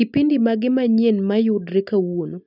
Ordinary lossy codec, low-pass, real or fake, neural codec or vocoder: none; 5.4 kHz; real; none